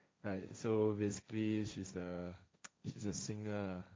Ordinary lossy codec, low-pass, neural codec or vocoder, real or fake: none; none; codec, 16 kHz, 1.1 kbps, Voila-Tokenizer; fake